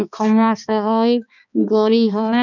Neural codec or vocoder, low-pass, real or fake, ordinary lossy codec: codec, 16 kHz, 2 kbps, X-Codec, HuBERT features, trained on balanced general audio; 7.2 kHz; fake; none